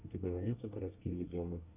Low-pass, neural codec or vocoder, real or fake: 3.6 kHz; codec, 24 kHz, 1 kbps, SNAC; fake